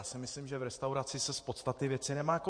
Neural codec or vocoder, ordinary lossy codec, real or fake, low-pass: vocoder, 48 kHz, 128 mel bands, Vocos; MP3, 48 kbps; fake; 9.9 kHz